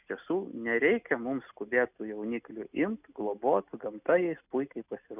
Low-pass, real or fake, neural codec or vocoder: 3.6 kHz; real; none